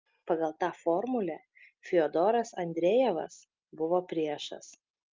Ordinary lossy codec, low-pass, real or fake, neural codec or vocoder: Opus, 24 kbps; 7.2 kHz; real; none